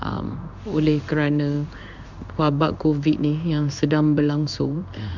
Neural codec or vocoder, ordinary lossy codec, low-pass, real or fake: codec, 16 kHz in and 24 kHz out, 1 kbps, XY-Tokenizer; none; 7.2 kHz; fake